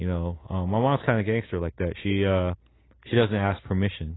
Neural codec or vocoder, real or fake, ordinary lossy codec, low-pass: none; real; AAC, 16 kbps; 7.2 kHz